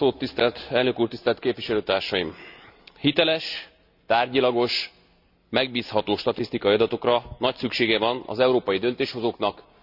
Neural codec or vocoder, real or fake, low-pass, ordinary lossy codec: none; real; 5.4 kHz; none